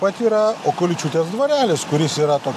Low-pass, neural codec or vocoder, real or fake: 14.4 kHz; none; real